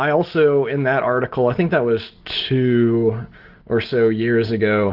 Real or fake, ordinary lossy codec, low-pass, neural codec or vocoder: real; Opus, 16 kbps; 5.4 kHz; none